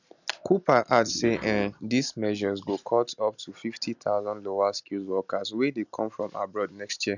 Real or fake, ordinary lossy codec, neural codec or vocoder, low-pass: real; none; none; 7.2 kHz